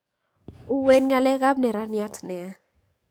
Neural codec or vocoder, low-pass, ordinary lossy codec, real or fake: codec, 44.1 kHz, 7.8 kbps, DAC; none; none; fake